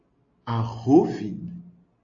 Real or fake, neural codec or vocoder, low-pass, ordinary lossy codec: real; none; 7.2 kHz; AAC, 48 kbps